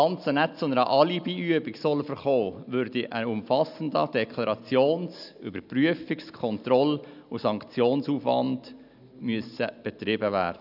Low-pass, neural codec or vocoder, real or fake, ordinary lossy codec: 5.4 kHz; none; real; none